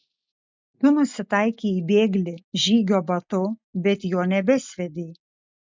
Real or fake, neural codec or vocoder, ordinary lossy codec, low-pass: real; none; MP3, 64 kbps; 7.2 kHz